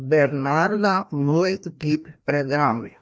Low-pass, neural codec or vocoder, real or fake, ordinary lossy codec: none; codec, 16 kHz, 1 kbps, FreqCodec, larger model; fake; none